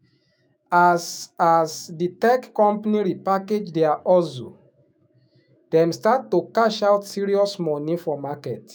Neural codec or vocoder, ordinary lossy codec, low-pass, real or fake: autoencoder, 48 kHz, 128 numbers a frame, DAC-VAE, trained on Japanese speech; none; none; fake